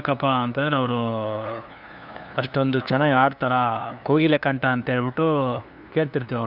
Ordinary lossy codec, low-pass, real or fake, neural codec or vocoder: none; 5.4 kHz; fake; codec, 16 kHz, 2 kbps, FunCodec, trained on LibriTTS, 25 frames a second